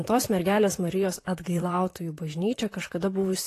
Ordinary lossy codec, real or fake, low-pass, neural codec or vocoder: AAC, 48 kbps; fake; 14.4 kHz; vocoder, 44.1 kHz, 128 mel bands, Pupu-Vocoder